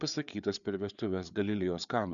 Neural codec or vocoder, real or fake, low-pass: codec, 16 kHz, 4 kbps, FreqCodec, larger model; fake; 7.2 kHz